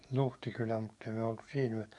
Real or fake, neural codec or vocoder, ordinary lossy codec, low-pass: fake; codec, 44.1 kHz, 7.8 kbps, DAC; none; 10.8 kHz